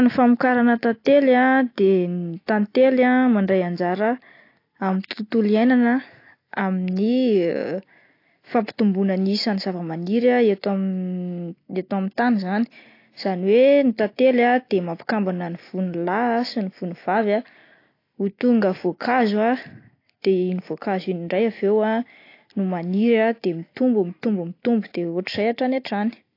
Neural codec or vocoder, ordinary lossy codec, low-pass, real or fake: none; AAC, 32 kbps; 5.4 kHz; real